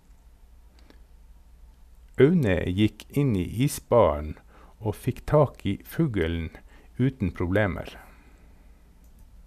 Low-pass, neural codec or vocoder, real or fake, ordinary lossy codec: 14.4 kHz; none; real; none